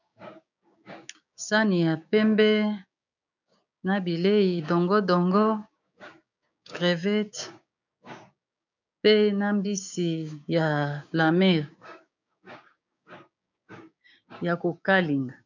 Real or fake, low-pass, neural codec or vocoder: fake; 7.2 kHz; codec, 16 kHz, 6 kbps, DAC